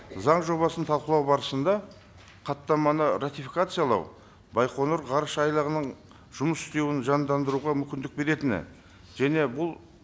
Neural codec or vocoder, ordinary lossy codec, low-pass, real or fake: none; none; none; real